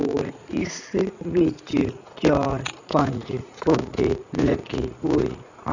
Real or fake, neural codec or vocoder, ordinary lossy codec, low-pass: fake; vocoder, 44.1 kHz, 80 mel bands, Vocos; none; 7.2 kHz